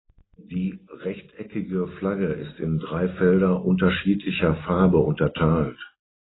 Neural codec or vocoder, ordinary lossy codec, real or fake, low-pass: none; AAC, 16 kbps; real; 7.2 kHz